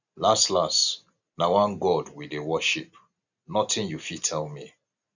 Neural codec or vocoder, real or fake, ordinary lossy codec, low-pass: none; real; none; 7.2 kHz